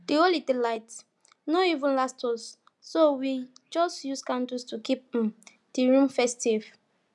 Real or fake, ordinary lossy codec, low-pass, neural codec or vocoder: real; none; 10.8 kHz; none